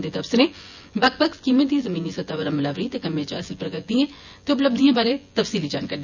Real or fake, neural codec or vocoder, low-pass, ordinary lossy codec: fake; vocoder, 24 kHz, 100 mel bands, Vocos; 7.2 kHz; none